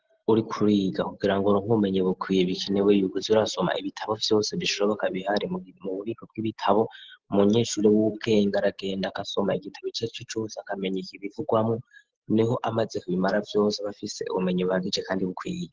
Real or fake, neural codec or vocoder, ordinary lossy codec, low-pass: real; none; Opus, 16 kbps; 7.2 kHz